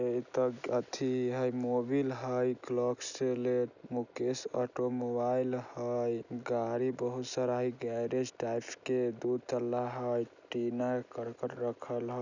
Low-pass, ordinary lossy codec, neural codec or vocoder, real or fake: 7.2 kHz; none; none; real